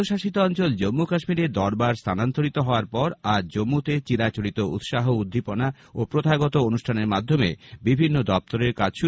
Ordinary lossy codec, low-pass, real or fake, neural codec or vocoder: none; none; real; none